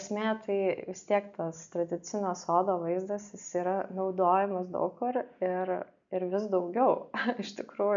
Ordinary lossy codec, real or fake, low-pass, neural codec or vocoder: MP3, 64 kbps; real; 7.2 kHz; none